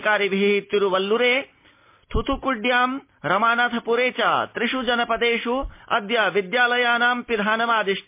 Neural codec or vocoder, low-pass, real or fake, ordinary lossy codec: none; 3.6 kHz; real; MP3, 24 kbps